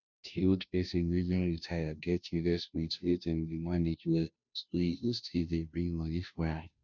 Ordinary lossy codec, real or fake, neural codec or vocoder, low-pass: none; fake; codec, 16 kHz, 0.5 kbps, FunCodec, trained on Chinese and English, 25 frames a second; 7.2 kHz